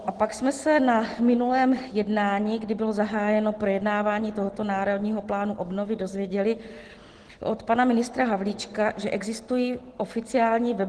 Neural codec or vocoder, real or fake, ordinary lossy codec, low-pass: none; real; Opus, 16 kbps; 10.8 kHz